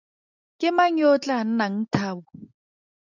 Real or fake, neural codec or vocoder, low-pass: real; none; 7.2 kHz